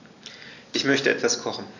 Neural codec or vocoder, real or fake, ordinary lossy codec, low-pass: none; real; none; 7.2 kHz